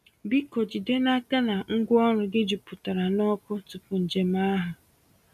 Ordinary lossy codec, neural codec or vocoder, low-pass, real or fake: none; none; 14.4 kHz; real